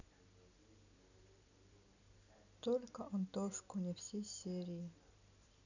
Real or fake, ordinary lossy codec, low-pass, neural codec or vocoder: real; none; 7.2 kHz; none